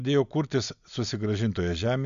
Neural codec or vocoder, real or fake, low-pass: none; real; 7.2 kHz